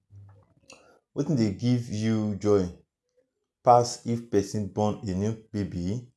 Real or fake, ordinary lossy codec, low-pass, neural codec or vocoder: real; none; none; none